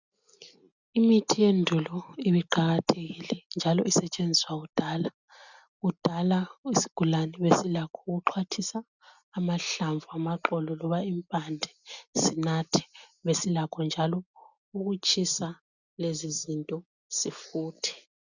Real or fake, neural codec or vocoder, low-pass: real; none; 7.2 kHz